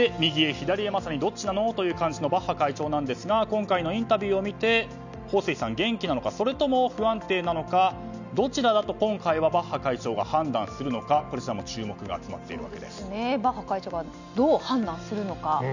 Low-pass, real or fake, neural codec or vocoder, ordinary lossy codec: 7.2 kHz; real; none; none